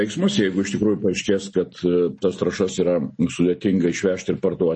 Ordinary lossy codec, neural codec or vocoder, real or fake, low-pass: MP3, 32 kbps; none; real; 10.8 kHz